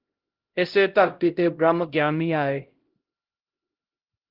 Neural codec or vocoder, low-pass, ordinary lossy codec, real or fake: codec, 16 kHz, 0.5 kbps, X-Codec, HuBERT features, trained on LibriSpeech; 5.4 kHz; Opus, 16 kbps; fake